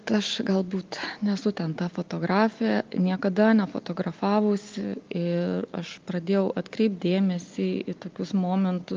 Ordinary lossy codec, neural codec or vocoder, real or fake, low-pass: Opus, 32 kbps; none; real; 7.2 kHz